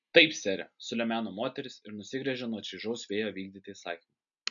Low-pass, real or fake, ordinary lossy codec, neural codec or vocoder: 7.2 kHz; real; MP3, 96 kbps; none